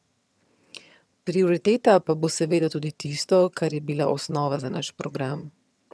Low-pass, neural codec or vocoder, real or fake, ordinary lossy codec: none; vocoder, 22.05 kHz, 80 mel bands, HiFi-GAN; fake; none